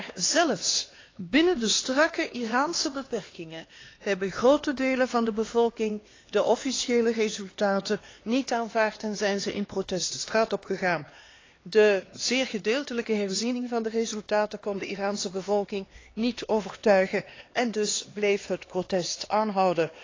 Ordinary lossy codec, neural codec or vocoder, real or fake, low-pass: AAC, 32 kbps; codec, 16 kHz, 2 kbps, X-Codec, HuBERT features, trained on LibriSpeech; fake; 7.2 kHz